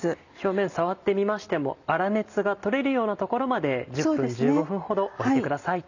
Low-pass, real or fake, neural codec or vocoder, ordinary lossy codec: 7.2 kHz; real; none; none